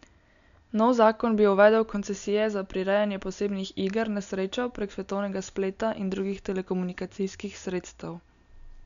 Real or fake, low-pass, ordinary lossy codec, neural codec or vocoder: real; 7.2 kHz; none; none